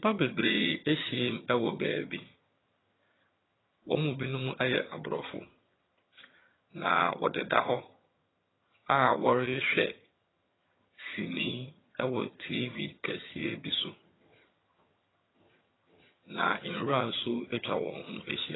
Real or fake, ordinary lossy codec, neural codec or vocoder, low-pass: fake; AAC, 16 kbps; vocoder, 22.05 kHz, 80 mel bands, HiFi-GAN; 7.2 kHz